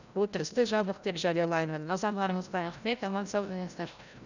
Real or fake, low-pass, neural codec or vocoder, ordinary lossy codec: fake; 7.2 kHz; codec, 16 kHz, 0.5 kbps, FreqCodec, larger model; none